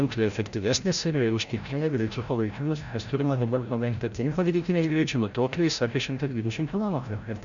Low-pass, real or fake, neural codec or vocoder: 7.2 kHz; fake; codec, 16 kHz, 0.5 kbps, FreqCodec, larger model